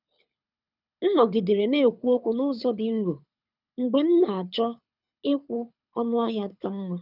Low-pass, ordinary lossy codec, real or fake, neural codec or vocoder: 5.4 kHz; none; fake; codec, 24 kHz, 6 kbps, HILCodec